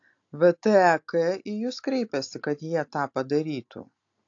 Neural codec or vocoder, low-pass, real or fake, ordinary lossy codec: none; 7.2 kHz; real; AAC, 48 kbps